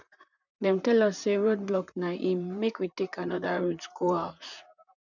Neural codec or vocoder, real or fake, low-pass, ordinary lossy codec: vocoder, 44.1 kHz, 128 mel bands, Pupu-Vocoder; fake; 7.2 kHz; none